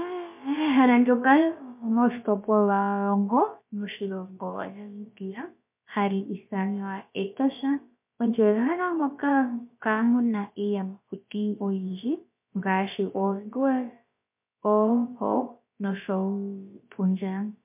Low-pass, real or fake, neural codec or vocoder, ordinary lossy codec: 3.6 kHz; fake; codec, 16 kHz, about 1 kbps, DyCAST, with the encoder's durations; MP3, 32 kbps